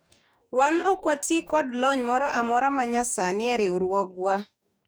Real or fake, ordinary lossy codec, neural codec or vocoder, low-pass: fake; none; codec, 44.1 kHz, 2.6 kbps, DAC; none